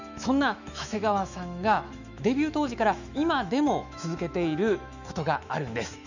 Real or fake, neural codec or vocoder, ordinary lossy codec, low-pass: fake; autoencoder, 48 kHz, 128 numbers a frame, DAC-VAE, trained on Japanese speech; none; 7.2 kHz